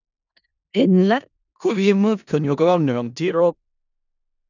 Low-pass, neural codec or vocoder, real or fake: 7.2 kHz; codec, 16 kHz in and 24 kHz out, 0.4 kbps, LongCat-Audio-Codec, four codebook decoder; fake